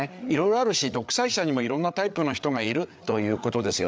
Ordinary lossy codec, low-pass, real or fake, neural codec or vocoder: none; none; fake; codec, 16 kHz, 8 kbps, FreqCodec, larger model